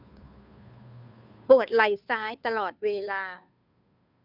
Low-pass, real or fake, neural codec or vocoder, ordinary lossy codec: 5.4 kHz; fake; codec, 16 kHz, 2 kbps, FunCodec, trained on Chinese and English, 25 frames a second; none